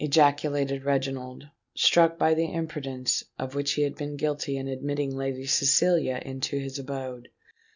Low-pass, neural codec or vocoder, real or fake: 7.2 kHz; none; real